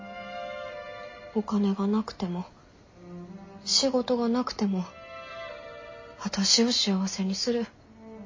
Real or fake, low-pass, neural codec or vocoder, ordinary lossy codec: real; 7.2 kHz; none; MP3, 32 kbps